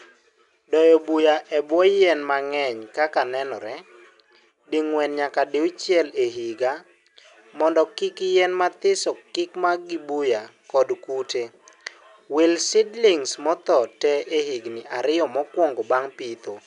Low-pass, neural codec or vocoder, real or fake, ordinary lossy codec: 10.8 kHz; none; real; none